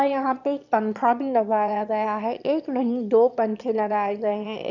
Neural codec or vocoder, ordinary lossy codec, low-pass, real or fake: autoencoder, 22.05 kHz, a latent of 192 numbers a frame, VITS, trained on one speaker; none; 7.2 kHz; fake